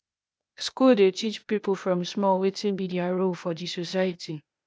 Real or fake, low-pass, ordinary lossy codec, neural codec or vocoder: fake; none; none; codec, 16 kHz, 0.8 kbps, ZipCodec